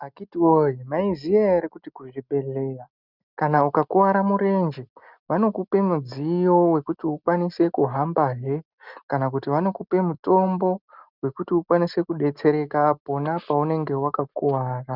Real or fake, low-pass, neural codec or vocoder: real; 5.4 kHz; none